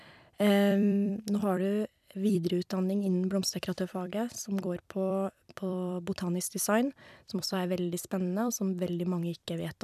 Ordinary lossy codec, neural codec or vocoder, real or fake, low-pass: none; vocoder, 44.1 kHz, 128 mel bands every 256 samples, BigVGAN v2; fake; 14.4 kHz